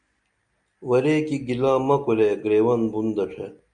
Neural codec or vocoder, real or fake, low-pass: none; real; 9.9 kHz